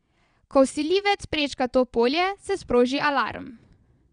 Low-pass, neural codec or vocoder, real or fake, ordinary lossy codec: 9.9 kHz; vocoder, 22.05 kHz, 80 mel bands, Vocos; fake; none